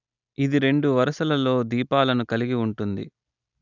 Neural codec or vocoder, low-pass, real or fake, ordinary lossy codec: none; 7.2 kHz; real; none